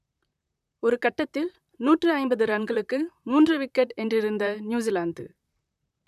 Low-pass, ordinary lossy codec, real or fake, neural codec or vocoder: 14.4 kHz; none; fake; vocoder, 44.1 kHz, 128 mel bands, Pupu-Vocoder